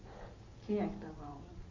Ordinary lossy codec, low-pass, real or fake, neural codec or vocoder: MP3, 32 kbps; 7.2 kHz; real; none